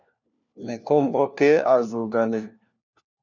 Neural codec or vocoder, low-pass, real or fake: codec, 16 kHz, 1 kbps, FunCodec, trained on LibriTTS, 50 frames a second; 7.2 kHz; fake